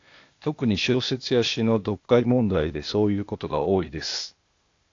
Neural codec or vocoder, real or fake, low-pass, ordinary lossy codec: codec, 16 kHz, 0.8 kbps, ZipCodec; fake; 7.2 kHz; AAC, 48 kbps